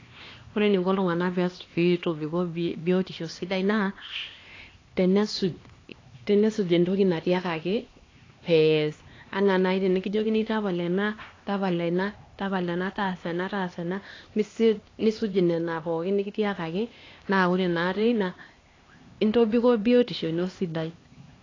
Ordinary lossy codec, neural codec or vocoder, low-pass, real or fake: AAC, 32 kbps; codec, 16 kHz, 2 kbps, X-Codec, HuBERT features, trained on LibriSpeech; 7.2 kHz; fake